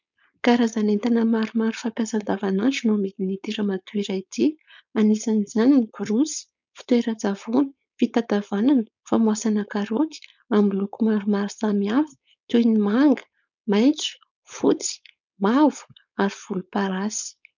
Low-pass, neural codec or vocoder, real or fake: 7.2 kHz; codec, 16 kHz, 4.8 kbps, FACodec; fake